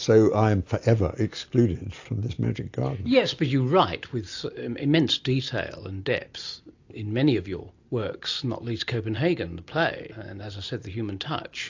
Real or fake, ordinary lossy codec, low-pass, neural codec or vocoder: real; AAC, 48 kbps; 7.2 kHz; none